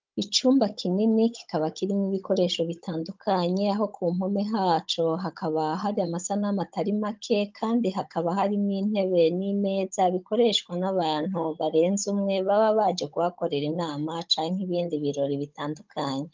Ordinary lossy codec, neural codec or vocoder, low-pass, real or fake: Opus, 24 kbps; codec, 16 kHz, 16 kbps, FunCodec, trained on Chinese and English, 50 frames a second; 7.2 kHz; fake